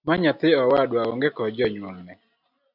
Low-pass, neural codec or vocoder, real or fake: 5.4 kHz; none; real